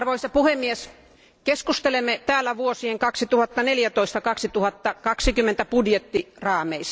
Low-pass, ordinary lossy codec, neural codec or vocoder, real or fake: none; none; none; real